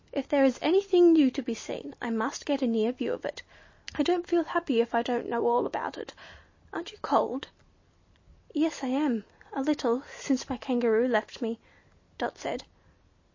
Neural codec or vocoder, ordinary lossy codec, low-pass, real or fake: none; MP3, 32 kbps; 7.2 kHz; real